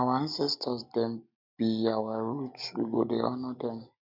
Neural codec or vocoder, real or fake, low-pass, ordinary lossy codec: none; real; 5.4 kHz; none